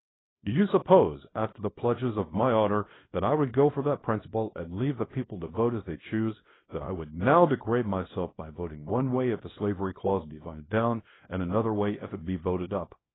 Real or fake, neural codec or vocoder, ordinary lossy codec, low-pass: fake; codec, 24 kHz, 0.9 kbps, WavTokenizer, small release; AAC, 16 kbps; 7.2 kHz